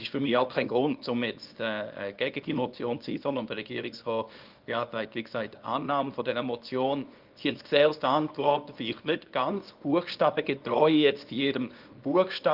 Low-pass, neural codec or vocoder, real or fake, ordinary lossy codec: 5.4 kHz; codec, 24 kHz, 0.9 kbps, WavTokenizer, small release; fake; Opus, 24 kbps